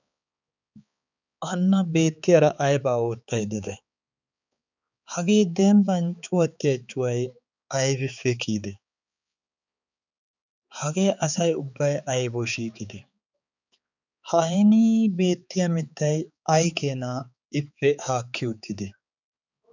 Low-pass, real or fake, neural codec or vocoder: 7.2 kHz; fake; codec, 16 kHz, 4 kbps, X-Codec, HuBERT features, trained on balanced general audio